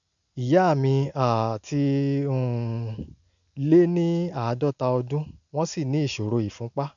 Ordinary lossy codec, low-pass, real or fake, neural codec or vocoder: none; 7.2 kHz; real; none